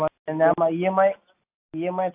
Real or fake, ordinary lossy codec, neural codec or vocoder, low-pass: real; AAC, 32 kbps; none; 3.6 kHz